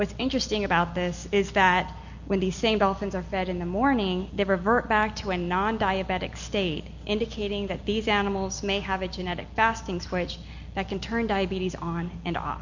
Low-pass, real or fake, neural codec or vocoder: 7.2 kHz; real; none